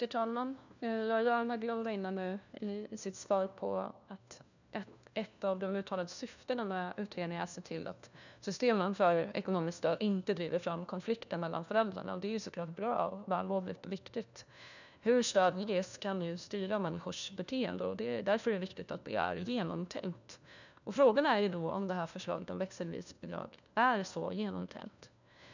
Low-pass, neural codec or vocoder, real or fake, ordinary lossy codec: 7.2 kHz; codec, 16 kHz, 1 kbps, FunCodec, trained on LibriTTS, 50 frames a second; fake; none